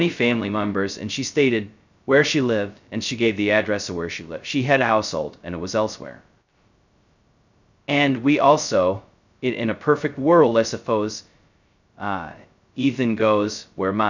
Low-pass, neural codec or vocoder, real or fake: 7.2 kHz; codec, 16 kHz, 0.2 kbps, FocalCodec; fake